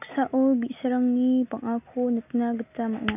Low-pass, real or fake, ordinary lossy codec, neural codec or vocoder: 3.6 kHz; real; none; none